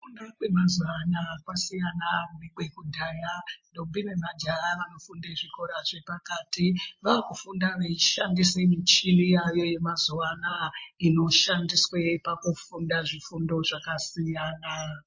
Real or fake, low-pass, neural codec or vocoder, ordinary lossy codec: real; 7.2 kHz; none; MP3, 32 kbps